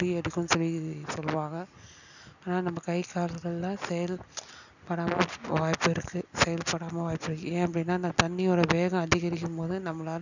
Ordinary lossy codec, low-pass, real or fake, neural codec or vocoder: none; 7.2 kHz; real; none